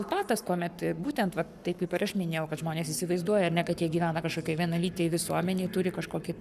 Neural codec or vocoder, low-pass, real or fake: codec, 44.1 kHz, 7.8 kbps, Pupu-Codec; 14.4 kHz; fake